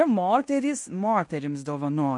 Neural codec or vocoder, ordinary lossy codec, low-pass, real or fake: codec, 16 kHz in and 24 kHz out, 0.9 kbps, LongCat-Audio-Codec, fine tuned four codebook decoder; MP3, 48 kbps; 10.8 kHz; fake